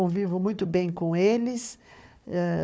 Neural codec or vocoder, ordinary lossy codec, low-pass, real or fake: codec, 16 kHz, 4 kbps, FunCodec, trained on Chinese and English, 50 frames a second; none; none; fake